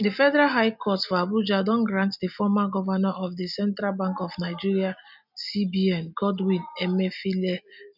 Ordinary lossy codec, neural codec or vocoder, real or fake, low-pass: none; none; real; 5.4 kHz